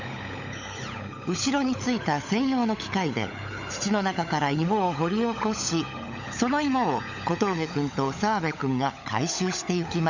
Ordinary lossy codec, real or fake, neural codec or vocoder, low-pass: none; fake; codec, 16 kHz, 16 kbps, FunCodec, trained on LibriTTS, 50 frames a second; 7.2 kHz